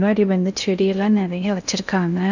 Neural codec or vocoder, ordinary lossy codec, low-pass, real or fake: codec, 16 kHz in and 24 kHz out, 0.6 kbps, FocalCodec, streaming, 2048 codes; none; 7.2 kHz; fake